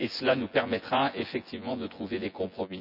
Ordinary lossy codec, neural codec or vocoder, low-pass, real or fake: none; vocoder, 24 kHz, 100 mel bands, Vocos; 5.4 kHz; fake